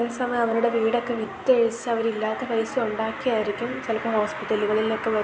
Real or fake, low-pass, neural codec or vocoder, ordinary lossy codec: real; none; none; none